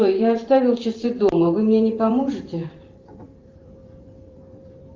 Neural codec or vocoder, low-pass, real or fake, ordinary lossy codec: vocoder, 44.1 kHz, 128 mel bands every 512 samples, BigVGAN v2; 7.2 kHz; fake; Opus, 32 kbps